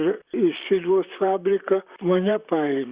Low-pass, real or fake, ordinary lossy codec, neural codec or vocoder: 3.6 kHz; fake; Opus, 64 kbps; vocoder, 44.1 kHz, 128 mel bands, Pupu-Vocoder